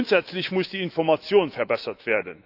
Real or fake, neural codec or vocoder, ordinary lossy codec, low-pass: fake; autoencoder, 48 kHz, 128 numbers a frame, DAC-VAE, trained on Japanese speech; none; 5.4 kHz